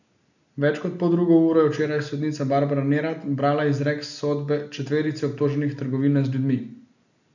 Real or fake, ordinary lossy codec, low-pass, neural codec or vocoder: real; none; 7.2 kHz; none